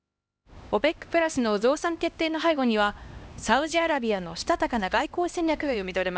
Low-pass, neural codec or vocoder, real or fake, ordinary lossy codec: none; codec, 16 kHz, 1 kbps, X-Codec, HuBERT features, trained on LibriSpeech; fake; none